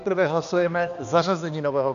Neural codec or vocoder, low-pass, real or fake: codec, 16 kHz, 2 kbps, X-Codec, HuBERT features, trained on balanced general audio; 7.2 kHz; fake